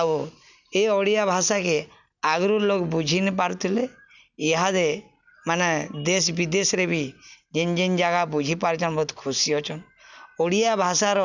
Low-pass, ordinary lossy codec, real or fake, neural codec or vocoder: 7.2 kHz; none; real; none